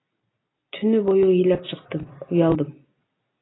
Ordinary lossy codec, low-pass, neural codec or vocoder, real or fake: AAC, 16 kbps; 7.2 kHz; none; real